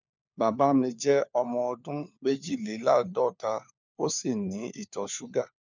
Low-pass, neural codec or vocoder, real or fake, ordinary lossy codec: 7.2 kHz; codec, 16 kHz, 4 kbps, FunCodec, trained on LibriTTS, 50 frames a second; fake; none